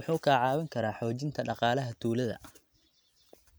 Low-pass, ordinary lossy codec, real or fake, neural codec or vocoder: none; none; real; none